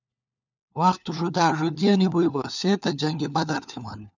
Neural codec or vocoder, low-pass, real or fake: codec, 16 kHz, 4 kbps, FunCodec, trained on LibriTTS, 50 frames a second; 7.2 kHz; fake